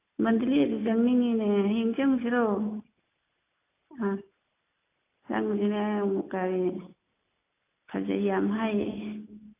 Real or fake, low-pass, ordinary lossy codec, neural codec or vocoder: real; 3.6 kHz; AAC, 24 kbps; none